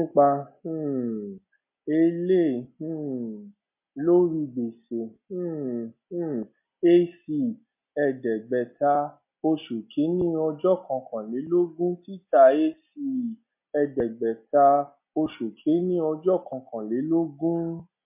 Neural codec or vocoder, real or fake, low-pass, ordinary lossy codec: none; real; 3.6 kHz; MP3, 32 kbps